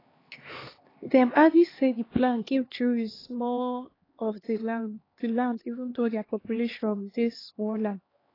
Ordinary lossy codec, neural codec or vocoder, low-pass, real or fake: AAC, 24 kbps; codec, 16 kHz, 2 kbps, X-Codec, HuBERT features, trained on LibriSpeech; 5.4 kHz; fake